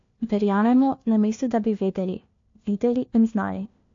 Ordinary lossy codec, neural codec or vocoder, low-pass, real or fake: AAC, 48 kbps; codec, 16 kHz, 1 kbps, FunCodec, trained on LibriTTS, 50 frames a second; 7.2 kHz; fake